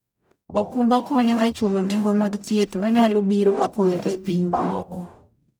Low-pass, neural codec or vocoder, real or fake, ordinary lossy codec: none; codec, 44.1 kHz, 0.9 kbps, DAC; fake; none